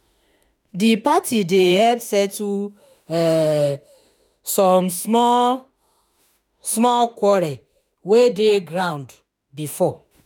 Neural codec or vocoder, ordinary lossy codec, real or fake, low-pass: autoencoder, 48 kHz, 32 numbers a frame, DAC-VAE, trained on Japanese speech; none; fake; none